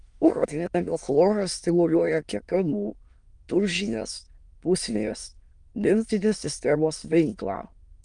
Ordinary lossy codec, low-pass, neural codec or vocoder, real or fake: Opus, 32 kbps; 9.9 kHz; autoencoder, 22.05 kHz, a latent of 192 numbers a frame, VITS, trained on many speakers; fake